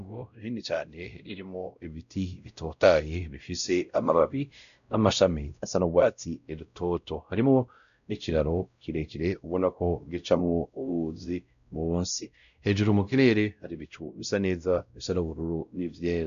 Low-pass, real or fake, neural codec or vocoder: 7.2 kHz; fake; codec, 16 kHz, 0.5 kbps, X-Codec, WavLM features, trained on Multilingual LibriSpeech